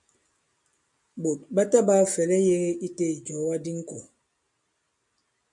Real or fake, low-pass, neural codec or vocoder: real; 10.8 kHz; none